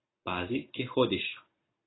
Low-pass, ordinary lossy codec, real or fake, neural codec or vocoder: 7.2 kHz; AAC, 16 kbps; real; none